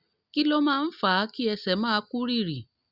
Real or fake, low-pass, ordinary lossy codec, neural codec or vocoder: real; 5.4 kHz; Opus, 64 kbps; none